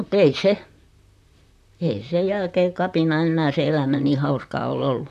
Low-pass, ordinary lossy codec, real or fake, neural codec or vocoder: 14.4 kHz; AAC, 96 kbps; fake; vocoder, 44.1 kHz, 128 mel bands, Pupu-Vocoder